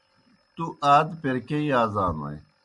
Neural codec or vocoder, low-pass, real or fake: none; 10.8 kHz; real